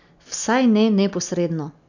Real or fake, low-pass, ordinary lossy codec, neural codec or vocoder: real; 7.2 kHz; none; none